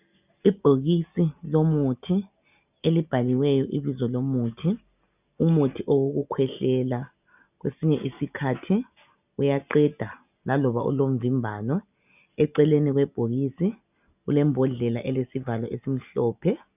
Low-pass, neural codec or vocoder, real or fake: 3.6 kHz; none; real